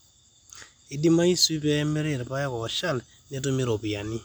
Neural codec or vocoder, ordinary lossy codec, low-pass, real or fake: none; none; none; real